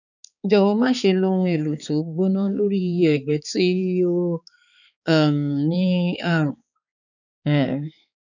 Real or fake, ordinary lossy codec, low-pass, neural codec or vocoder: fake; none; 7.2 kHz; codec, 16 kHz, 4 kbps, X-Codec, HuBERT features, trained on balanced general audio